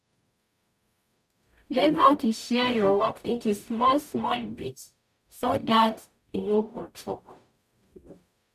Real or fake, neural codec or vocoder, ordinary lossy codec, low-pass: fake; codec, 44.1 kHz, 0.9 kbps, DAC; none; 14.4 kHz